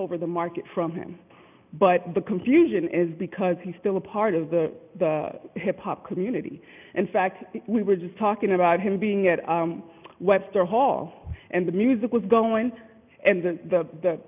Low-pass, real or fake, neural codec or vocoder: 3.6 kHz; real; none